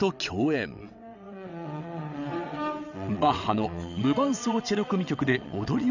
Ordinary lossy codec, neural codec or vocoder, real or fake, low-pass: none; vocoder, 22.05 kHz, 80 mel bands, WaveNeXt; fake; 7.2 kHz